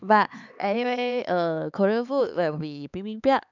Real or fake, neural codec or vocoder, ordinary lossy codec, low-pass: fake; codec, 16 kHz, 4 kbps, X-Codec, HuBERT features, trained on LibriSpeech; none; 7.2 kHz